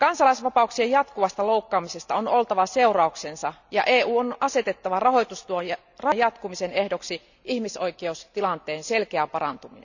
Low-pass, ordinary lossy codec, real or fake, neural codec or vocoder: 7.2 kHz; none; real; none